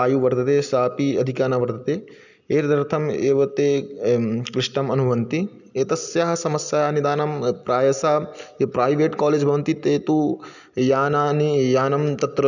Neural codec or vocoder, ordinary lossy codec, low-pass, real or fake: none; none; 7.2 kHz; real